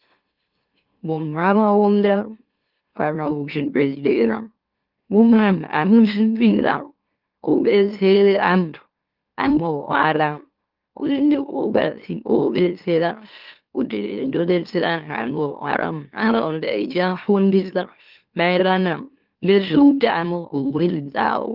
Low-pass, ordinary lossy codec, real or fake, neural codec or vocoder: 5.4 kHz; Opus, 32 kbps; fake; autoencoder, 44.1 kHz, a latent of 192 numbers a frame, MeloTTS